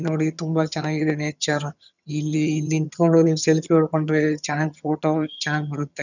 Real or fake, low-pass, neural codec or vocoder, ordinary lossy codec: fake; 7.2 kHz; vocoder, 22.05 kHz, 80 mel bands, HiFi-GAN; none